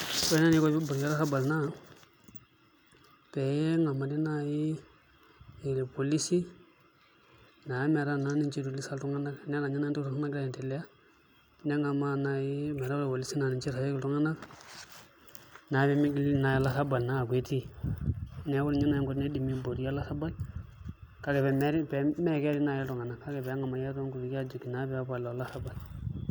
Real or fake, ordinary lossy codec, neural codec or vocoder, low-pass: real; none; none; none